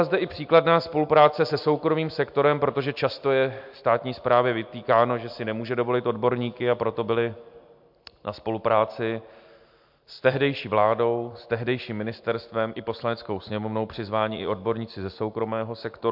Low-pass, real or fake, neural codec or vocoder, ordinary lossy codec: 5.4 kHz; real; none; MP3, 48 kbps